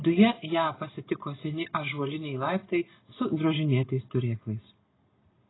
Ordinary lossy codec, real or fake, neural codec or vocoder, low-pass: AAC, 16 kbps; real; none; 7.2 kHz